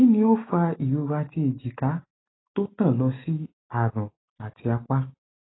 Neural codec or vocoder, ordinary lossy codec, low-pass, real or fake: none; AAC, 16 kbps; 7.2 kHz; real